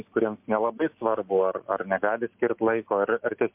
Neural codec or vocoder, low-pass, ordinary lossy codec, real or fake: none; 3.6 kHz; MP3, 32 kbps; real